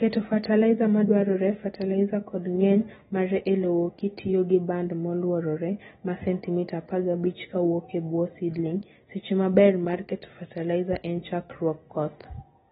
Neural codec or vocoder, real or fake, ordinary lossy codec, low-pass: none; real; AAC, 16 kbps; 19.8 kHz